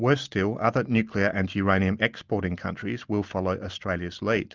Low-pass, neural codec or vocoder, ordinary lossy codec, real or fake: 7.2 kHz; none; Opus, 24 kbps; real